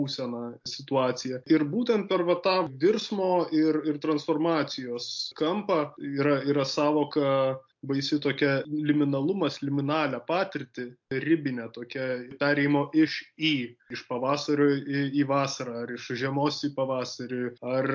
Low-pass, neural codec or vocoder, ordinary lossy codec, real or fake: 7.2 kHz; none; MP3, 48 kbps; real